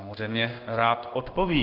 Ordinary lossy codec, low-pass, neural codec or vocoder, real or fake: Opus, 24 kbps; 5.4 kHz; codec, 16 kHz in and 24 kHz out, 1 kbps, XY-Tokenizer; fake